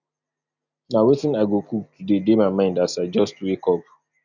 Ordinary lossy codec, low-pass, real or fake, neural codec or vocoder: none; 7.2 kHz; real; none